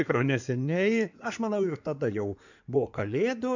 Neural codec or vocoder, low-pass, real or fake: codec, 16 kHz in and 24 kHz out, 2.2 kbps, FireRedTTS-2 codec; 7.2 kHz; fake